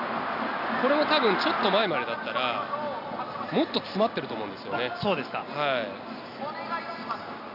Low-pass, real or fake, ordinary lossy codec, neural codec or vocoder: 5.4 kHz; real; none; none